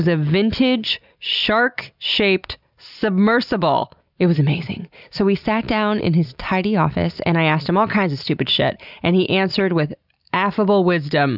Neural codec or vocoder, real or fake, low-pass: none; real; 5.4 kHz